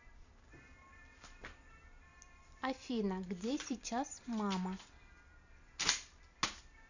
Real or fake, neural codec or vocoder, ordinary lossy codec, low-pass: fake; vocoder, 44.1 kHz, 128 mel bands every 256 samples, BigVGAN v2; none; 7.2 kHz